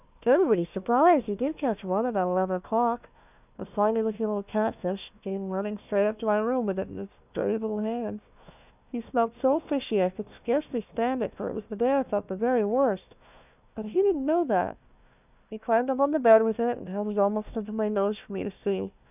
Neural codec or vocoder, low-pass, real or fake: codec, 16 kHz, 1 kbps, FunCodec, trained on Chinese and English, 50 frames a second; 3.6 kHz; fake